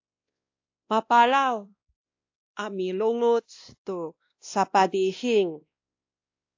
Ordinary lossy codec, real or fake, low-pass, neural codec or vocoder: AAC, 48 kbps; fake; 7.2 kHz; codec, 16 kHz, 1 kbps, X-Codec, WavLM features, trained on Multilingual LibriSpeech